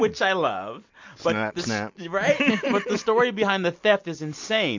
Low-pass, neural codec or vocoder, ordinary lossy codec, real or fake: 7.2 kHz; none; MP3, 48 kbps; real